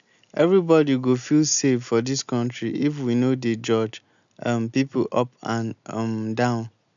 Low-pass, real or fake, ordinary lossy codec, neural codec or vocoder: 7.2 kHz; real; none; none